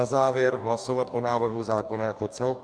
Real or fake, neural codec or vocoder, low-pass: fake; codec, 44.1 kHz, 2.6 kbps, DAC; 9.9 kHz